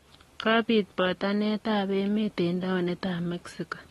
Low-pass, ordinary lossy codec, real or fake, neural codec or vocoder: 19.8 kHz; AAC, 32 kbps; real; none